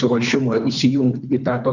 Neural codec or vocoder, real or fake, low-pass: codec, 24 kHz, 3 kbps, HILCodec; fake; 7.2 kHz